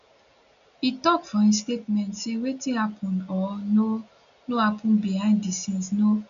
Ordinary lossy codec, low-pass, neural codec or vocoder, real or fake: AAC, 96 kbps; 7.2 kHz; none; real